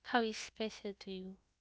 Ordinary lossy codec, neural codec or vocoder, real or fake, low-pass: none; codec, 16 kHz, about 1 kbps, DyCAST, with the encoder's durations; fake; none